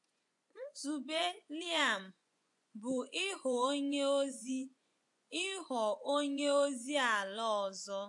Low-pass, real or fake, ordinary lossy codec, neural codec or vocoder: 10.8 kHz; fake; AAC, 64 kbps; vocoder, 24 kHz, 100 mel bands, Vocos